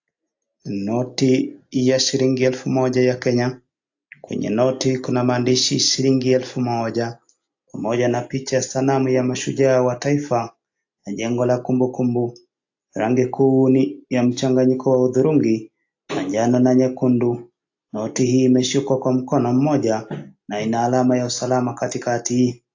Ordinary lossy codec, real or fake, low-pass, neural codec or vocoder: AAC, 48 kbps; real; 7.2 kHz; none